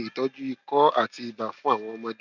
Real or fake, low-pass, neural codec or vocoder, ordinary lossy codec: real; 7.2 kHz; none; none